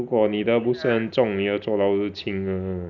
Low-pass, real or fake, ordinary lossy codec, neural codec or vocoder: 7.2 kHz; real; none; none